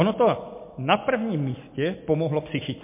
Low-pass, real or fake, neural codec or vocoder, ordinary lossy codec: 3.6 kHz; real; none; MP3, 24 kbps